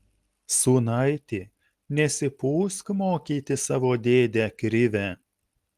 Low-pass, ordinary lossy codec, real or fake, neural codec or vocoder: 14.4 kHz; Opus, 24 kbps; real; none